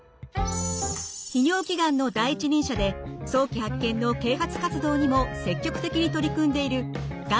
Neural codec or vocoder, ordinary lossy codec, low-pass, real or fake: none; none; none; real